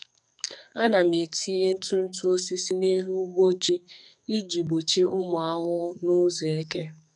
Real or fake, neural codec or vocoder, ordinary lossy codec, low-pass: fake; codec, 44.1 kHz, 2.6 kbps, SNAC; none; 10.8 kHz